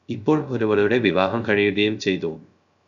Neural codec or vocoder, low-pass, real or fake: codec, 16 kHz, 0.3 kbps, FocalCodec; 7.2 kHz; fake